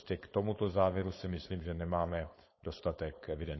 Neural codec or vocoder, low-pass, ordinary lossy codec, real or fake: codec, 16 kHz, 4.8 kbps, FACodec; 7.2 kHz; MP3, 24 kbps; fake